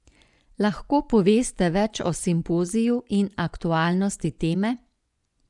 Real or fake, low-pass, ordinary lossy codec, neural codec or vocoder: fake; 10.8 kHz; none; vocoder, 24 kHz, 100 mel bands, Vocos